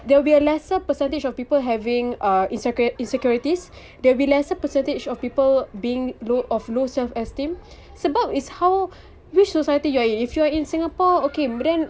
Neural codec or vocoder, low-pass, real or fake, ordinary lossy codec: none; none; real; none